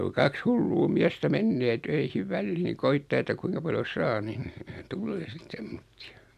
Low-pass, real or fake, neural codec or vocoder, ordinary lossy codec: 14.4 kHz; real; none; MP3, 96 kbps